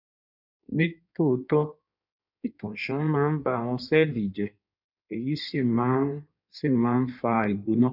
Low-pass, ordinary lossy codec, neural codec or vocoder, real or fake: 5.4 kHz; none; codec, 16 kHz in and 24 kHz out, 1.1 kbps, FireRedTTS-2 codec; fake